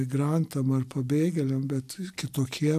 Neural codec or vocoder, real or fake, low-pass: none; real; 14.4 kHz